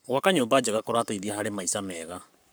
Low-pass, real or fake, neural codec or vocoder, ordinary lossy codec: none; fake; codec, 44.1 kHz, 7.8 kbps, Pupu-Codec; none